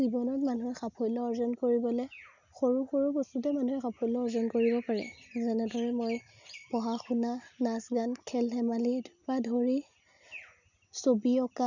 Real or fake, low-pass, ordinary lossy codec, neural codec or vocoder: real; 7.2 kHz; none; none